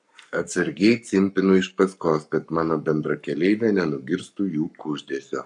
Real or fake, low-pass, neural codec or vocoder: fake; 10.8 kHz; codec, 44.1 kHz, 7.8 kbps, Pupu-Codec